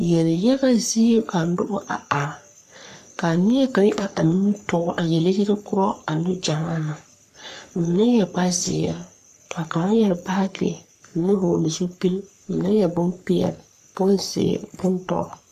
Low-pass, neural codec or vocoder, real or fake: 14.4 kHz; codec, 44.1 kHz, 3.4 kbps, Pupu-Codec; fake